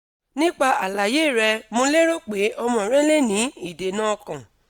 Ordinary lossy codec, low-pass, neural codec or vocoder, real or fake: none; none; none; real